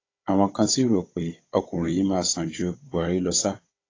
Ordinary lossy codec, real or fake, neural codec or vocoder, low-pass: AAC, 32 kbps; fake; codec, 16 kHz, 16 kbps, FunCodec, trained on Chinese and English, 50 frames a second; 7.2 kHz